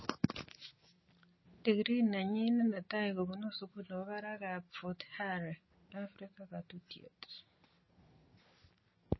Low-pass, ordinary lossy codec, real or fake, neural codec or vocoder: 7.2 kHz; MP3, 24 kbps; real; none